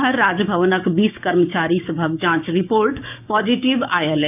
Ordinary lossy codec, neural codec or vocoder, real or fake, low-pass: none; codec, 16 kHz, 6 kbps, DAC; fake; 3.6 kHz